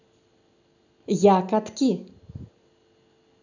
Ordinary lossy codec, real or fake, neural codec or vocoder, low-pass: none; real; none; 7.2 kHz